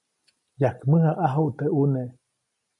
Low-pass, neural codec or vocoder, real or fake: 10.8 kHz; none; real